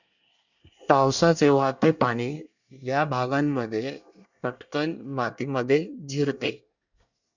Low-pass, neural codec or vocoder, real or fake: 7.2 kHz; codec, 24 kHz, 1 kbps, SNAC; fake